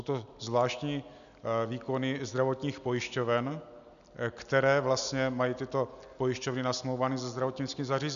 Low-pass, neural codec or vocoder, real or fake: 7.2 kHz; none; real